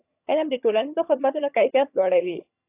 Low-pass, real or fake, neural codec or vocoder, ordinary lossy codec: 3.6 kHz; fake; vocoder, 22.05 kHz, 80 mel bands, HiFi-GAN; AAC, 24 kbps